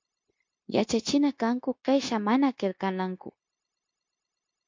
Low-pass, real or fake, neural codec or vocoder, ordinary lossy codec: 7.2 kHz; fake; codec, 16 kHz, 0.9 kbps, LongCat-Audio-Codec; MP3, 48 kbps